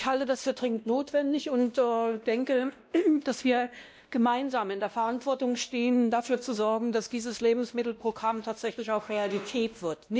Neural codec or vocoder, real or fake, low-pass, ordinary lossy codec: codec, 16 kHz, 1 kbps, X-Codec, WavLM features, trained on Multilingual LibriSpeech; fake; none; none